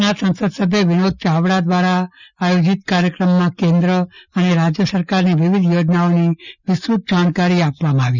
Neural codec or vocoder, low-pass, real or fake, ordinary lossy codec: none; 7.2 kHz; real; none